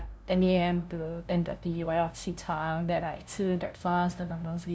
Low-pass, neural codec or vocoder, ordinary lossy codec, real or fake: none; codec, 16 kHz, 0.5 kbps, FunCodec, trained on LibriTTS, 25 frames a second; none; fake